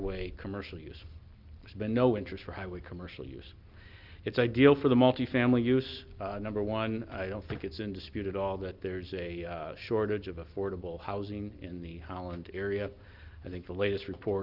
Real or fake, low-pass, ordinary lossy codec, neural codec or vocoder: real; 5.4 kHz; Opus, 32 kbps; none